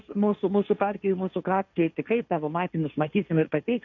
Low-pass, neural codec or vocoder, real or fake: 7.2 kHz; codec, 16 kHz, 1.1 kbps, Voila-Tokenizer; fake